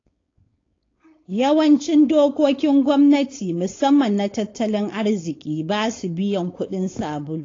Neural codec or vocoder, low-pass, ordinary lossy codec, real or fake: codec, 16 kHz, 4.8 kbps, FACodec; 7.2 kHz; AAC, 32 kbps; fake